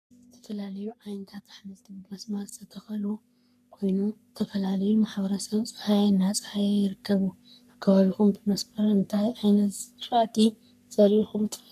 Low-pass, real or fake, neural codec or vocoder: 14.4 kHz; fake; codec, 44.1 kHz, 3.4 kbps, Pupu-Codec